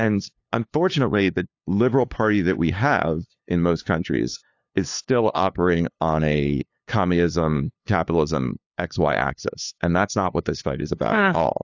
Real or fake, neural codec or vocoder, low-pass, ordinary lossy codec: fake; codec, 16 kHz, 2 kbps, FunCodec, trained on LibriTTS, 25 frames a second; 7.2 kHz; AAC, 48 kbps